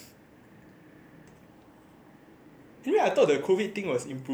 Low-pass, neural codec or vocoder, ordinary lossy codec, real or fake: none; vocoder, 44.1 kHz, 128 mel bands every 512 samples, BigVGAN v2; none; fake